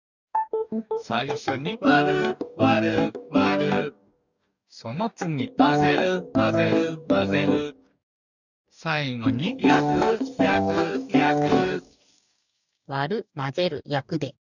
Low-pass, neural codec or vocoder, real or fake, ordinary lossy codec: 7.2 kHz; codec, 44.1 kHz, 2.6 kbps, DAC; fake; none